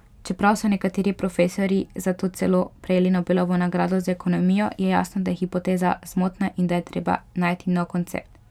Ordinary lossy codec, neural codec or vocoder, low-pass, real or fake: none; vocoder, 44.1 kHz, 128 mel bands every 512 samples, BigVGAN v2; 19.8 kHz; fake